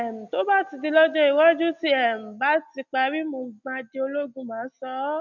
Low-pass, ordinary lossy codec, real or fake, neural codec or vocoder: 7.2 kHz; none; real; none